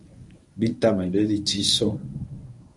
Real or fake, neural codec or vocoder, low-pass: fake; codec, 24 kHz, 0.9 kbps, WavTokenizer, medium speech release version 1; 10.8 kHz